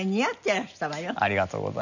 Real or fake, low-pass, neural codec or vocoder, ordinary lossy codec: real; 7.2 kHz; none; none